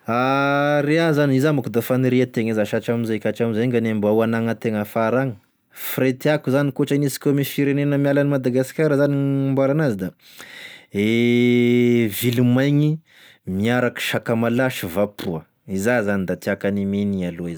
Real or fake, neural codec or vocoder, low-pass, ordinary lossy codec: real; none; none; none